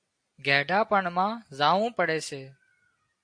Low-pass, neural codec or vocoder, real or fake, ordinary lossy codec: 9.9 kHz; none; real; AAC, 64 kbps